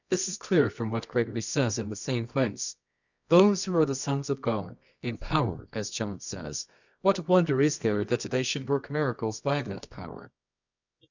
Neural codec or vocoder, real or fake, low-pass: codec, 24 kHz, 0.9 kbps, WavTokenizer, medium music audio release; fake; 7.2 kHz